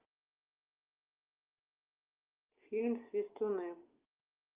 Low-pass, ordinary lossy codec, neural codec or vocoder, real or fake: 3.6 kHz; Opus, 32 kbps; none; real